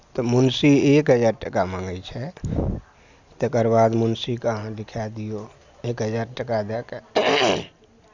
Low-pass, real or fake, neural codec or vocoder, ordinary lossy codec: 7.2 kHz; real; none; Opus, 64 kbps